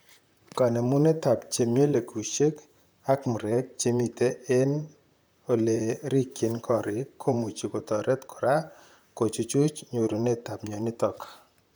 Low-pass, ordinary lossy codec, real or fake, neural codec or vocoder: none; none; fake; vocoder, 44.1 kHz, 128 mel bands, Pupu-Vocoder